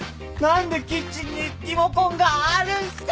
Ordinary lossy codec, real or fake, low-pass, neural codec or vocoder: none; real; none; none